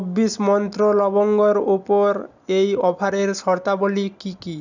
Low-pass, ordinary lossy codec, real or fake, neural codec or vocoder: 7.2 kHz; none; real; none